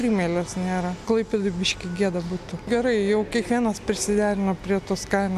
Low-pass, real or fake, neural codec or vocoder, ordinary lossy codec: 14.4 kHz; real; none; MP3, 96 kbps